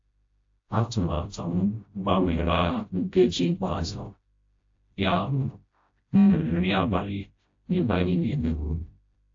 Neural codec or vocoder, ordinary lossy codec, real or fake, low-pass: codec, 16 kHz, 0.5 kbps, FreqCodec, smaller model; AAC, 48 kbps; fake; 7.2 kHz